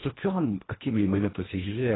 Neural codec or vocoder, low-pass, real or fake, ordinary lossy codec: codec, 24 kHz, 1.5 kbps, HILCodec; 7.2 kHz; fake; AAC, 16 kbps